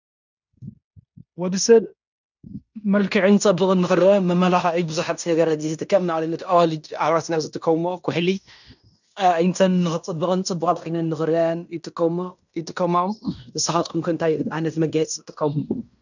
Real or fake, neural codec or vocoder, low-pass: fake; codec, 16 kHz in and 24 kHz out, 0.9 kbps, LongCat-Audio-Codec, fine tuned four codebook decoder; 7.2 kHz